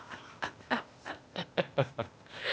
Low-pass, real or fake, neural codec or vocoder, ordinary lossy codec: none; fake; codec, 16 kHz, 0.8 kbps, ZipCodec; none